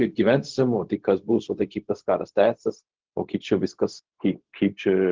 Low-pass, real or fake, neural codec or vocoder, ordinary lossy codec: 7.2 kHz; fake; codec, 16 kHz, 0.4 kbps, LongCat-Audio-Codec; Opus, 16 kbps